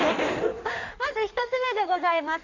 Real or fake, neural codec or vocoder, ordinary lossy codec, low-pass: fake; codec, 16 kHz in and 24 kHz out, 1.1 kbps, FireRedTTS-2 codec; none; 7.2 kHz